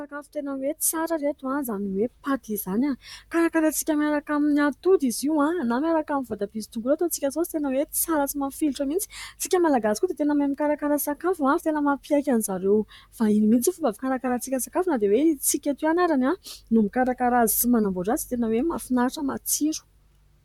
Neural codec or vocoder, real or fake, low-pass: vocoder, 44.1 kHz, 128 mel bands, Pupu-Vocoder; fake; 19.8 kHz